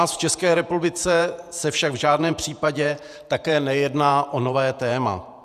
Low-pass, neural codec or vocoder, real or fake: 14.4 kHz; vocoder, 48 kHz, 128 mel bands, Vocos; fake